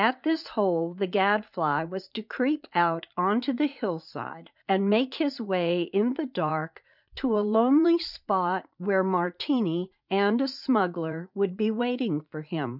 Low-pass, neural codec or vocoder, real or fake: 5.4 kHz; vocoder, 44.1 kHz, 80 mel bands, Vocos; fake